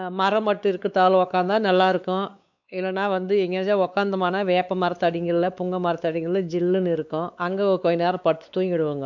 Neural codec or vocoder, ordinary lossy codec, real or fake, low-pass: codec, 16 kHz, 4 kbps, X-Codec, WavLM features, trained on Multilingual LibriSpeech; none; fake; 7.2 kHz